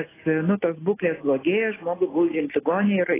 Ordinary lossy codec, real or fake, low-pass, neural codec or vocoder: AAC, 16 kbps; real; 3.6 kHz; none